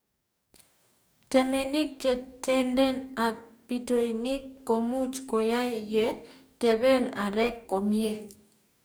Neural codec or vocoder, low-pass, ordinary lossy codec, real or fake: codec, 44.1 kHz, 2.6 kbps, DAC; none; none; fake